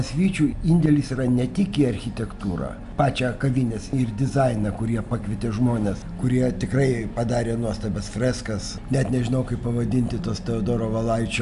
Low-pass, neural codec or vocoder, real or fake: 10.8 kHz; none; real